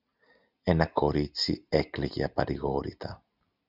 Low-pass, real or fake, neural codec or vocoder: 5.4 kHz; real; none